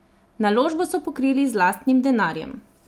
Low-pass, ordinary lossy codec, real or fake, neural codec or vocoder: 19.8 kHz; Opus, 32 kbps; real; none